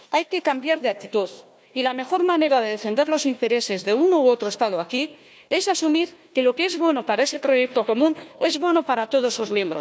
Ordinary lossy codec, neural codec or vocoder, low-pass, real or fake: none; codec, 16 kHz, 1 kbps, FunCodec, trained on Chinese and English, 50 frames a second; none; fake